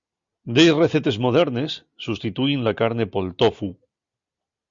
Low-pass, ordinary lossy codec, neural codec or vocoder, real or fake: 7.2 kHz; Opus, 64 kbps; none; real